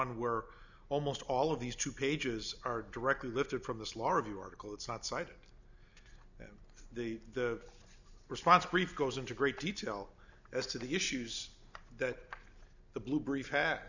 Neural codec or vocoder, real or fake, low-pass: none; real; 7.2 kHz